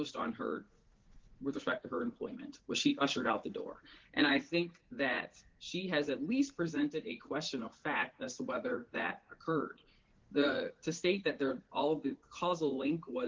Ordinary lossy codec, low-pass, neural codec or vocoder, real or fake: Opus, 16 kbps; 7.2 kHz; vocoder, 44.1 kHz, 80 mel bands, Vocos; fake